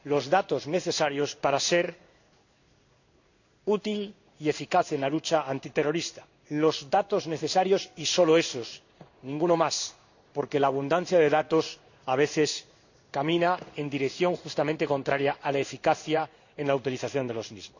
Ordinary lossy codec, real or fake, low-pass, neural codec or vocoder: none; fake; 7.2 kHz; codec, 16 kHz in and 24 kHz out, 1 kbps, XY-Tokenizer